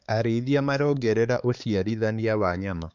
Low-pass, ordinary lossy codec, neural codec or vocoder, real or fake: 7.2 kHz; none; codec, 16 kHz, 4 kbps, X-Codec, HuBERT features, trained on balanced general audio; fake